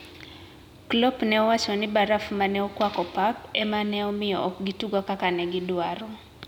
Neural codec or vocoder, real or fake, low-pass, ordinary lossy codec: none; real; 19.8 kHz; none